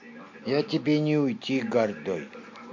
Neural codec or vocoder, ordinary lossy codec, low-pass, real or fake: none; MP3, 48 kbps; 7.2 kHz; real